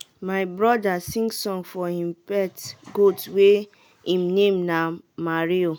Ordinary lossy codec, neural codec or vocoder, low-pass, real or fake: none; none; none; real